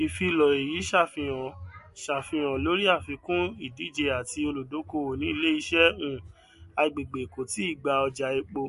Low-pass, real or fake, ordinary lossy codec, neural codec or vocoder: 14.4 kHz; real; MP3, 48 kbps; none